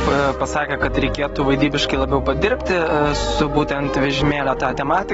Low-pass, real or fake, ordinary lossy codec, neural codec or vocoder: 19.8 kHz; real; AAC, 24 kbps; none